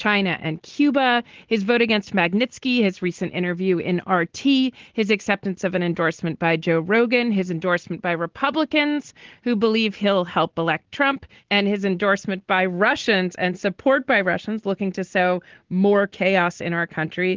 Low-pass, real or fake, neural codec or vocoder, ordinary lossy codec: 7.2 kHz; real; none; Opus, 16 kbps